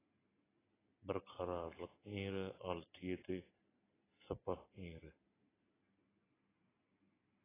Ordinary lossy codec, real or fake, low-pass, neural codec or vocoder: AAC, 16 kbps; real; 3.6 kHz; none